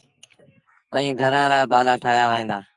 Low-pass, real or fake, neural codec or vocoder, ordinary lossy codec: 10.8 kHz; fake; codec, 44.1 kHz, 2.6 kbps, SNAC; Opus, 64 kbps